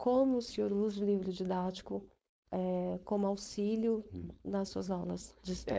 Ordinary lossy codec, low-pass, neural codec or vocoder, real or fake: none; none; codec, 16 kHz, 4.8 kbps, FACodec; fake